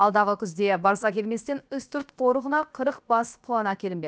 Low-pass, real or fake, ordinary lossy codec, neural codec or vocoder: none; fake; none; codec, 16 kHz, 0.7 kbps, FocalCodec